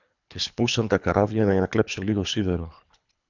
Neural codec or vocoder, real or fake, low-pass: codec, 24 kHz, 3 kbps, HILCodec; fake; 7.2 kHz